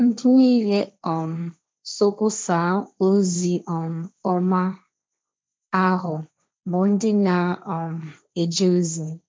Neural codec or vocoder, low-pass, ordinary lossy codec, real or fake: codec, 16 kHz, 1.1 kbps, Voila-Tokenizer; none; none; fake